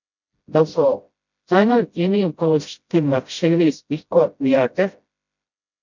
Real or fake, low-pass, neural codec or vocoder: fake; 7.2 kHz; codec, 16 kHz, 0.5 kbps, FreqCodec, smaller model